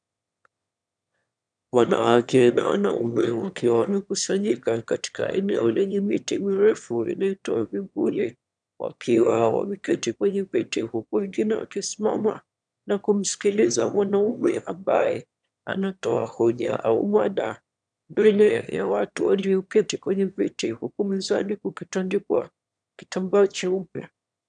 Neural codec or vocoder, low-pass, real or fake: autoencoder, 22.05 kHz, a latent of 192 numbers a frame, VITS, trained on one speaker; 9.9 kHz; fake